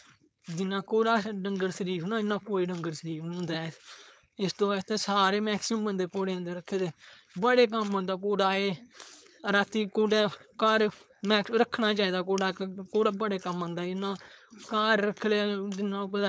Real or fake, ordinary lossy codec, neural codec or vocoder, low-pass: fake; none; codec, 16 kHz, 4.8 kbps, FACodec; none